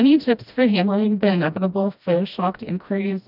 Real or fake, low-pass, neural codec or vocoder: fake; 5.4 kHz; codec, 16 kHz, 1 kbps, FreqCodec, smaller model